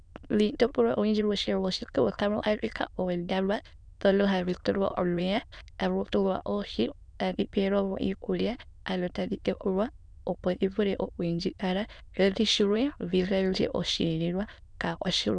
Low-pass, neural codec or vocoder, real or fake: 9.9 kHz; autoencoder, 22.05 kHz, a latent of 192 numbers a frame, VITS, trained on many speakers; fake